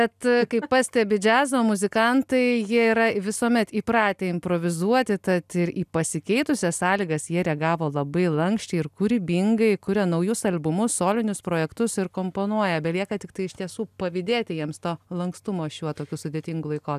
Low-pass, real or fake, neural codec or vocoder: 14.4 kHz; real; none